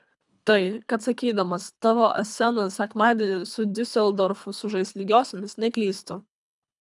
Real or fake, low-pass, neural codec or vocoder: fake; 10.8 kHz; codec, 24 kHz, 3 kbps, HILCodec